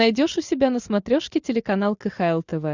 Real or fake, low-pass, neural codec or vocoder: real; 7.2 kHz; none